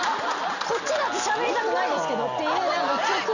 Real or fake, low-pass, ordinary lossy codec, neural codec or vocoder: real; 7.2 kHz; none; none